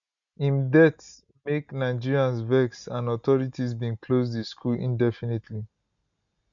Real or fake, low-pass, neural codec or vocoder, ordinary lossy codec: real; 7.2 kHz; none; none